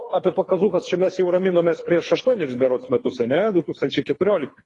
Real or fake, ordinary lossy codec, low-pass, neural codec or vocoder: fake; AAC, 32 kbps; 10.8 kHz; codec, 24 kHz, 3 kbps, HILCodec